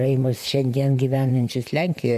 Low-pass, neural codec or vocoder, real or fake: 14.4 kHz; vocoder, 44.1 kHz, 128 mel bands, Pupu-Vocoder; fake